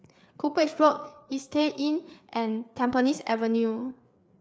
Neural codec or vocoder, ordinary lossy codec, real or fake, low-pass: codec, 16 kHz, 4 kbps, FreqCodec, larger model; none; fake; none